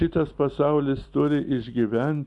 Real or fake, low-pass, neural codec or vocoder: real; 10.8 kHz; none